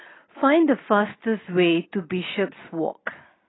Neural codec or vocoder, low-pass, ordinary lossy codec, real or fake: none; 7.2 kHz; AAC, 16 kbps; real